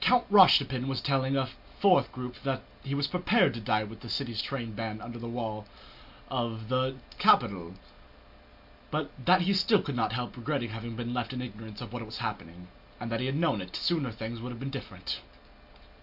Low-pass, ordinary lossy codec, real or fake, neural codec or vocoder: 5.4 kHz; MP3, 48 kbps; real; none